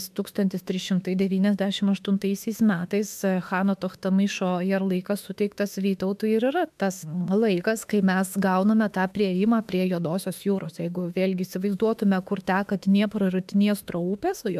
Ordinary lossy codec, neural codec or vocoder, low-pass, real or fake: AAC, 96 kbps; autoencoder, 48 kHz, 32 numbers a frame, DAC-VAE, trained on Japanese speech; 14.4 kHz; fake